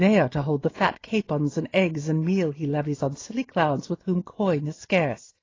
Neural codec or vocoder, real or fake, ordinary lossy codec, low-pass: none; real; AAC, 32 kbps; 7.2 kHz